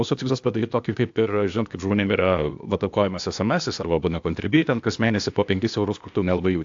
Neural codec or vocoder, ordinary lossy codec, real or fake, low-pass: codec, 16 kHz, 0.8 kbps, ZipCodec; AAC, 64 kbps; fake; 7.2 kHz